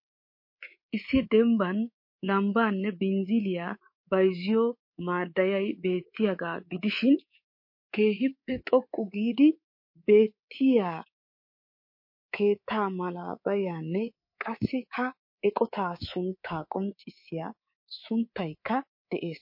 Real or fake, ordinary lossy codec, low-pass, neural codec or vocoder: fake; MP3, 32 kbps; 5.4 kHz; codec, 24 kHz, 3.1 kbps, DualCodec